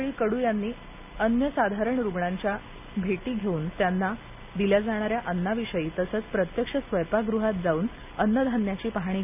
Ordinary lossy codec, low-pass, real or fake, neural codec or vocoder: none; 3.6 kHz; real; none